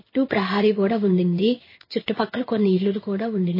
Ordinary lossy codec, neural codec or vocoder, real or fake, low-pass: MP3, 24 kbps; codec, 16 kHz in and 24 kHz out, 1 kbps, XY-Tokenizer; fake; 5.4 kHz